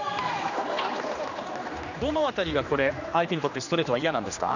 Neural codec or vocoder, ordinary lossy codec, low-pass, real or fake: codec, 16 kHz, 2 kbps, X-Codec, HuBERT features, trained on general audio; none; 7.2 kHz; fake